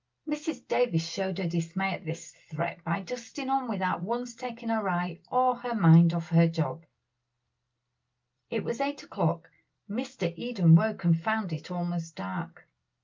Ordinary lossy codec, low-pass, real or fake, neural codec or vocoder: Opus, 24 kbps; 7.2 kHz; real; none